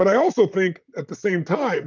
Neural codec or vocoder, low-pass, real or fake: vocoder, 44.1 kHz, 128 mel bands, Pupu-Vocoder; 7.2 kHz; fake